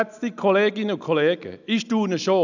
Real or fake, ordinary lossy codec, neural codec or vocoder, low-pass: real; none; none; 7.2 kHz